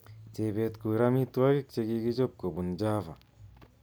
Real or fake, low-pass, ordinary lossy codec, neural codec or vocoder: real; none; none; none